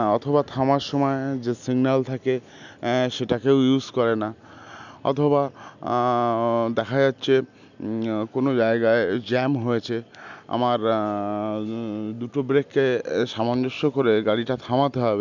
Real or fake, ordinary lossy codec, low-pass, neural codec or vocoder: real; none; 7.2 kHz; none